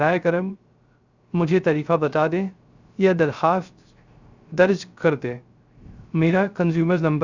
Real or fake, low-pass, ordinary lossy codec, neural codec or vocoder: fake; 7.2 kHz; Opus, 64 kbps; codec, 16 kHz, 0.3 kbps, FocalCodec